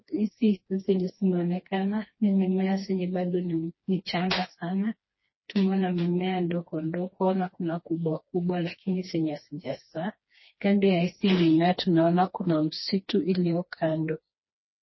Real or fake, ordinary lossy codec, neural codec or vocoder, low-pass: fake; MP3, 24 kbps; codec, 16 kHz, 2 kbps, FreqCodec, smaller model; 7.2 kHz